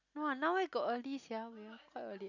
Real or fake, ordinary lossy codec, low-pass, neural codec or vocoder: real; none; 7.2 kHz; none